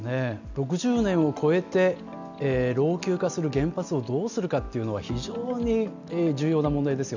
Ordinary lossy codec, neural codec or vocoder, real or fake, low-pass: none; none; real; 7.2 kHz